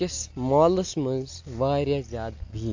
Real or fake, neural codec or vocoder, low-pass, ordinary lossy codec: real; none; 7.2 kHz; AAC, 48 kbps